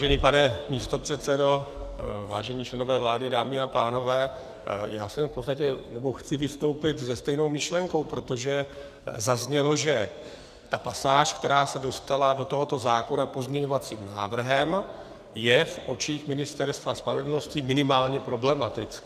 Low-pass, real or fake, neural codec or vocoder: 14.4 kHz; fake; codec, 44.1 kHz, 2.6 kbps, SNAC